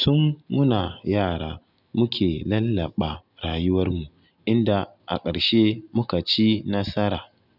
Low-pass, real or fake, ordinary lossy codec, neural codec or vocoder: 5.4 kHz; real; none; none